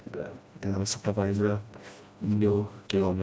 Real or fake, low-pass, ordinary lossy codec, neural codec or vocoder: fake; none; none; codec, 16 kHz, 1 kbps, FreqCodec, smaller model